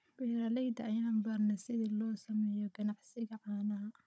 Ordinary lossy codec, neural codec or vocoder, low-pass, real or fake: none; codec, 16 kHz, 16 kbps, FunCodec, trained on Chinese and English, 50 frames a second; none; fake